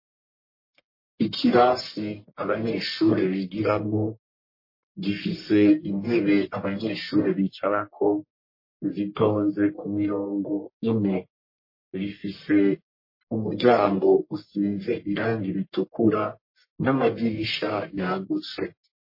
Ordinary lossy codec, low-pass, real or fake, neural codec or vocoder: MP3, 24 kbps; 5.4 kHz; fake; codec, 44.1 kHz, 1.7 kbps, Pupu-Codec